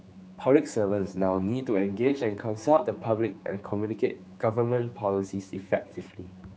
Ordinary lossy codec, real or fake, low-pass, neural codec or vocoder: none; fake; none; codec, 16 kHz, 4 kbps, X-Codec, HuBERT features, trained on general audio